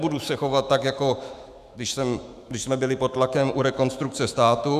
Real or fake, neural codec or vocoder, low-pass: fake; autoencoder, 48 kHz, 128 numbers a frame, DAC-VAE, trained on Japanese speech; 14.4 kHz